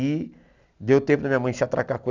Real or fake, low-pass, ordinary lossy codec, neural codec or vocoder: real; 7.2 kHz; none; none